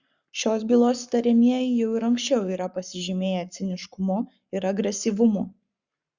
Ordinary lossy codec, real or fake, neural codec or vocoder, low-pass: Opus, 64 kbps; fake; codec, 44.1 kHz, 7.8 kbps, Pupu-Codec; 7.2 kHz